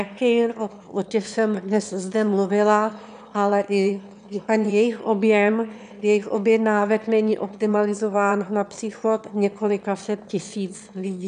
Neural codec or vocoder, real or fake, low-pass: autoencoder, 22.05 kHz, a latent of 192 numbers a frame, VITS, trained on one speaker; fake; 9.9 kHz